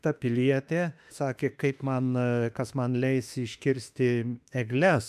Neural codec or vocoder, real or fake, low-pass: autoencoder, 48 kHz, 32 numbers a frame, DAC-VAE, trained on Japanese speech; fake; 14.4 kHz